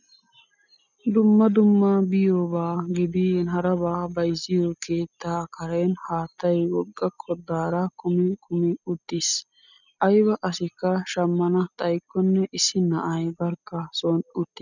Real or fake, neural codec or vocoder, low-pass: real; none; 7.2 kHz